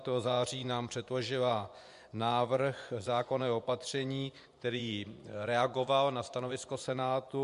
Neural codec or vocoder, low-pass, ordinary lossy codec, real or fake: vocoder, 24 kHz, 100 mel bands, Vocos; 10.8 kHz; MP3, 64 kbps; fake